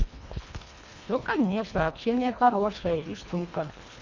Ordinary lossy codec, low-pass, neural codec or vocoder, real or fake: Opus, 64 kbps; 7.2 kHz; codec, 24 kHz, 1.5 kbps, HILCodec; fake